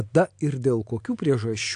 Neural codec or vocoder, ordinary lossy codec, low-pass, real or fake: none; AAC, 64 kbps; 9.9 kHz; real